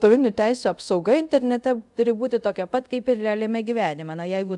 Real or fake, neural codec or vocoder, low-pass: fake; codec, 24 kHz, 0.5 kbps, DualCodec; 10.8 kHz